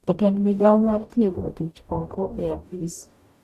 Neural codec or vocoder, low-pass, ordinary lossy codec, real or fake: codec, 44.1 kHz, 0.9 kbps, DAC; 14.4 kHz; Opus, 64 kbps; fake